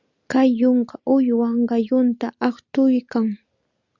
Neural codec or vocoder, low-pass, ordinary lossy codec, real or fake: none; 7.2 kHz; Opus, 64 kbps; real